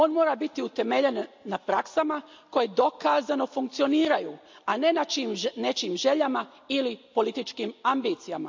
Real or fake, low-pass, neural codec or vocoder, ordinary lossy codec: real; 7.2 kHz; none; MP3, 64 kbps